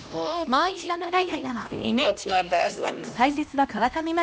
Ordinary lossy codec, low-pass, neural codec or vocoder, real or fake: none; none; codec, 16 kHz, 1 kbps, X-Codec, HuBERT features, trained on LibriSpeech; fake